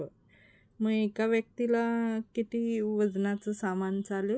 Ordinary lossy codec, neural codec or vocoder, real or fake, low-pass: none; none; real; none